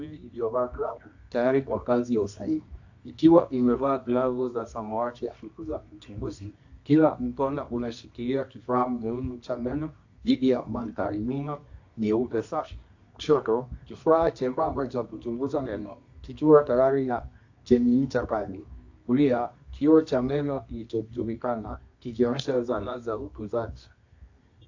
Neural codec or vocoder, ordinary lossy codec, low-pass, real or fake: codec, 24 kHz, 0.9 kbps, WavTokenizer, medium music audio release; AAC, 48 kbps; 7.2 kHz; fake